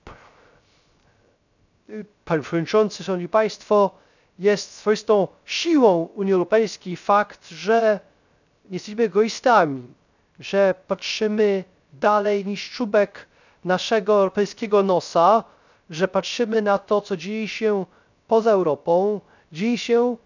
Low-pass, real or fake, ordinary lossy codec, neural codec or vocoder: 7.2 kHz; fake; none; codec, 16 kHz, 0.3 kbps, FocalCodec